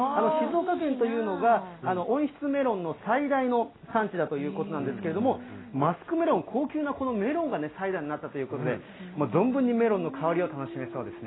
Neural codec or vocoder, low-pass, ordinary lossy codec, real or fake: none; 7.2 kHz; AAC, 16 kbps; real